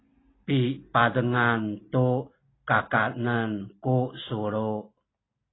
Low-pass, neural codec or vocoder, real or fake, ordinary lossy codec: 7.2 kHz; none; real; AAC, 16 kbps